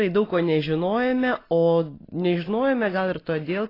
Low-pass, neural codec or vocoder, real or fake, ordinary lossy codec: 5.4 kHz; none; real; AAC, 24 kbps